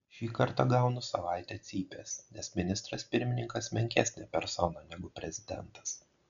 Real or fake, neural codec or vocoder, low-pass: real; none; 7.2 kHz